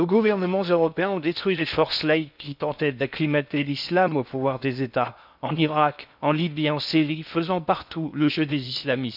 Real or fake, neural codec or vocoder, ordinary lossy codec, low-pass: fake; codec, 16 kHz in and 24 kHz out, 0.6 kbps, FocalCodec, streaming, 2048 codes; none; 5.4 kHz